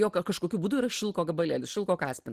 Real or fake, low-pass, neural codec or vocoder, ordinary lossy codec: real; 14.4 kHz; none; Opus, 16 kbps